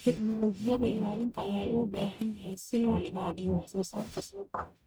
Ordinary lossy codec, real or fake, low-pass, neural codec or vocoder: none; fake; none; codec, 44.1 kHz, 0.9 kbps, DAC